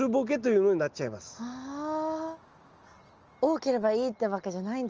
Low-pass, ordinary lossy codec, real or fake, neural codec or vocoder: 7.2 kHz; Opus, 24 kbps; real; none